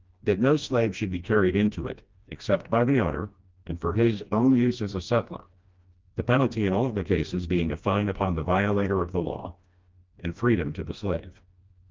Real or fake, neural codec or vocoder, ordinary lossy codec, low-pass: fake; codec, 16 kHz, 1 kbps, FreqCodec, smaller model; Opus, 24 kbps; 7.2 kHz